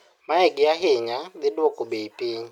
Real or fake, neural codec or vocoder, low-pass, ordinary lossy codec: real; none; 19.8 kHz; none